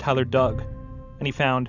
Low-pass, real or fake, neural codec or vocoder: 7.2 kHz; real; none